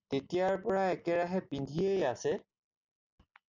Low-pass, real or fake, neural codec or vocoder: 7.2 kHz; real; none